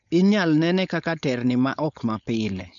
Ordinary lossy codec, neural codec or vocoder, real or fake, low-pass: none; codec, 16 kHz, 4.8 kbps, FACodec; fake; 7.2 kHz